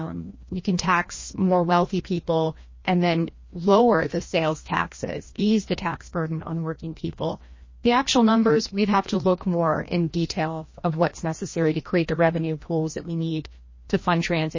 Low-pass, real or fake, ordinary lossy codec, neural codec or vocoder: 7.2 kHz; fake; MP3, 32 kbps; codec, 16 kHz, 1 kbps, FreqCodec, larger model